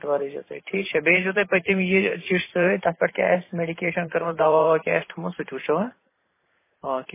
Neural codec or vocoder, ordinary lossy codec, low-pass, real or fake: vocoder, 44.1 kHz, 128 mel bands every 512 samples, BigVGAN v2; MP3, 16 kbps; 3.6 kHz; fake